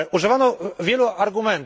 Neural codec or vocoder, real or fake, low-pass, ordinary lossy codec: none; real; none; none